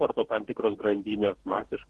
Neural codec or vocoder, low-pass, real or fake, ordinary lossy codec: codec, 44.1 kHz, 2.6 kbps, DAC; 10.8 kHz; fake; Opus, 16 kbps